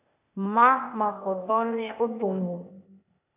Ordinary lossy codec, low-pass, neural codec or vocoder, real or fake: AAC, 32 kbps; 3.6 kHz; codec, 16 kHz, 0.8 kbps, ZipCodec; fake